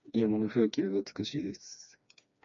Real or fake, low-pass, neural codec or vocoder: fake; 7.2 kHz; codec, 16 kHz, 2 kbps, FreqCodec, smaller model